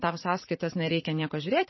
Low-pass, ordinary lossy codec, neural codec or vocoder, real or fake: 7.2 kHz; MP3, 24 kbps; vocoder, 44.1 kHz, 128 mel bands every 512 samples, BigVGAN v2; fake